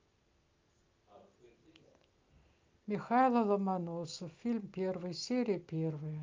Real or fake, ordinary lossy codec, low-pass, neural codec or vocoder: real; Opus, 24 kbps; 7.2 kHz; none